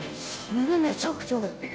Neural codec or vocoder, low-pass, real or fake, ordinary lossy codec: codec, 16 kHz, 0.5 kbps, FunCodec, trained on Chinese and English, 25 frames a second; none; fake; none